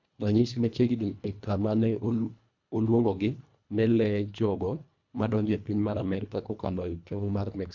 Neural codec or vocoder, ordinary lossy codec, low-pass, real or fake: codec, 24 kHz, 1.5 kbps, HILCodec; none; 7.2 kHz; fake